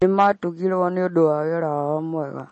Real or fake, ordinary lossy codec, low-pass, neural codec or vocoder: real; MP3, 32 kbps; 10.8 kHz; none